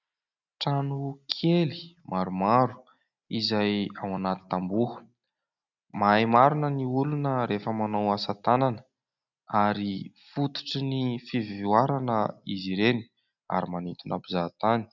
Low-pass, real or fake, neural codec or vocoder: 7.2 kHz; real; none